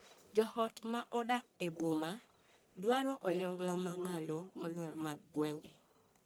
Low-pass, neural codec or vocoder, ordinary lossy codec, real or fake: none; codec, 44.1 kHz, 1.7 kbps, Pupu-Codec; none; fake